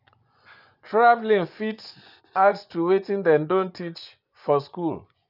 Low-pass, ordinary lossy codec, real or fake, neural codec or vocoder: 5.4 kHz; none; fake; vocoder, 24 kHz, 100 mel bands, Vocos